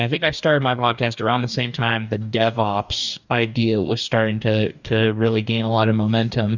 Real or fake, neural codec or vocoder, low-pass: fake; codec, 44.1 kHz, 2.6 kbps, DAC; 7.2 kHz